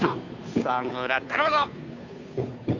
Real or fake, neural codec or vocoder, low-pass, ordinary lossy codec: fake; codec, 16 kHz, 2 kbps, FunCodec, trained on Chinese and English, 25 frames a second; 7.2 kHz; none